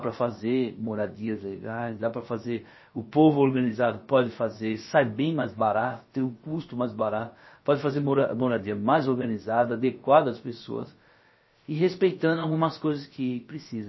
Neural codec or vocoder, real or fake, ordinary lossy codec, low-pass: codec, 16 kHz, about 1 kbps, DyCAST, with the encoder's durations; fake; MP3, 24 kbps; 7.2 kHz